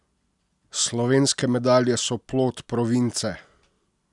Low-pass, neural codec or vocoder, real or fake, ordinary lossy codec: 10.8 kHz; none; real; none